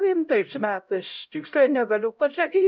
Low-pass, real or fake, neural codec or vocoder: 7.2 kHz; fake; codec, 16 kHz, 0.5 kbps, X-Codec, HuBERT features, trained on LibriSpeech